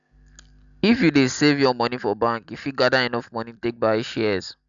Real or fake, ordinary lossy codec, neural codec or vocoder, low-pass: real; MP3, 96 kbps; none; 7.2 kHz